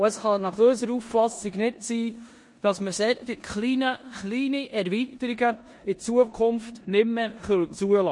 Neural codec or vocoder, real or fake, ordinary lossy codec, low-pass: codec, 16 kHz in and 24 kHz out, 0.9 kbps, LongCat-Audio-Codec, four codebook decoder; fake; MP3, 48 kbps; 10.8 kHz